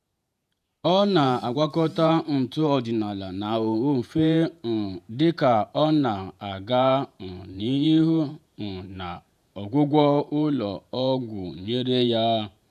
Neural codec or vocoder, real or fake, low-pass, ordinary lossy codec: vocoder, 48 kHz, 128 mel bands, Vocos; fake; 14.4 kHz; none